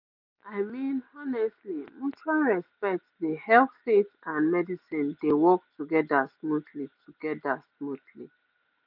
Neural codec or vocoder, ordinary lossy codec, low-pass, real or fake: none; none; 5.4 kHz; real